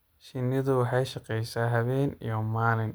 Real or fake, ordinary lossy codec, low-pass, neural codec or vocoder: real; none; none; none